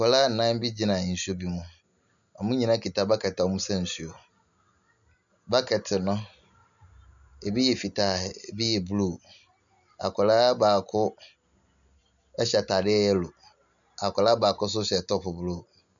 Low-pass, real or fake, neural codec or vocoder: 7.2 kHz; real; none